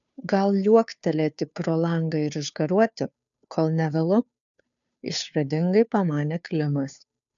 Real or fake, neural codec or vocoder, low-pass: fake; codec, 16 kHz, 2 kbps, FunCodec, trained on Chinese and English, 25 frames a second; 7.2 kHz